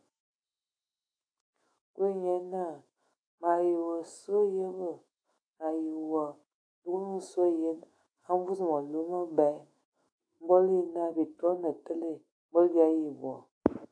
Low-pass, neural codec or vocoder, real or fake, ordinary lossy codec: 9.9 kHz; autoencoder, 48 kHz, 128 numbers a frame, DAC-VAE, trained on Japanese speech; fake; AAC, 48 kbps